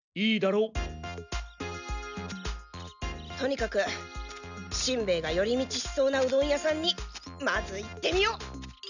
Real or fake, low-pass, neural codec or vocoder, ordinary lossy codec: real; 7.2 kHz; none; none